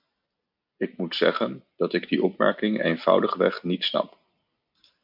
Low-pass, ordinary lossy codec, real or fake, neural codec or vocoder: 5.4 kHz; MP3, 48 kbps; real; none